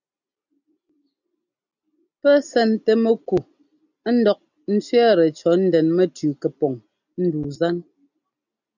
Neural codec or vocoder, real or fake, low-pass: none; real; 7.2 kHz